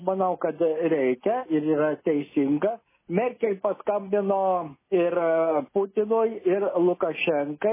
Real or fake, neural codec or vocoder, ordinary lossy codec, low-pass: real; none; MP3, 16 kbps; 3.6 kHz